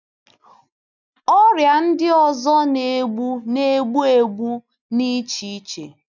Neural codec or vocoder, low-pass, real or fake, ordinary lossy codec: none; 7.2 kHz; real; none